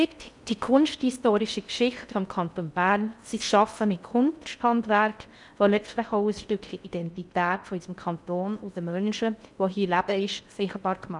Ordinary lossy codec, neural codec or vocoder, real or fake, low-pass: none; codec, 16 kHz in and 24 kHz out, 0.6 kbps, FocalCodec, streaming, 4096 codes; fake; 10.8 kHz